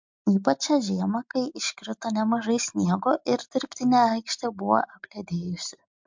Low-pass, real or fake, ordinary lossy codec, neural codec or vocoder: 7.2 kHz; real; MP3, 64 kbps; none